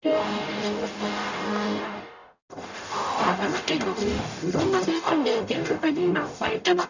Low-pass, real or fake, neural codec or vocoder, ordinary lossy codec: 7.2 kHz; fake; codec, 44.1 kHz, 0.9 kbps, DAC; none